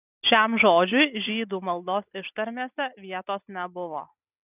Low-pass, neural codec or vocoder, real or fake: 3.6 kHz; none; real